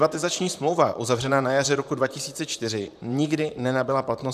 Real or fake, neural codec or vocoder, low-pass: fake; vocoder, 44.1 kHz, 128 mel bands, Pupu-Vocoder; 14.4 kHz